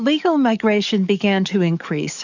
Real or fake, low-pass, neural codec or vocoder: fake; 7.2 kHz; codec, 44.1 kHz, 7.8 kbps, DAC